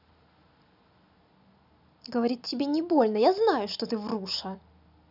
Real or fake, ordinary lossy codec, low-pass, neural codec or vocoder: real; none; 5.4 kHz; none